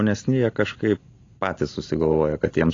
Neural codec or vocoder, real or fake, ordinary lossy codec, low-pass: none; real; AAC, 32 kbps; 7.2 kHz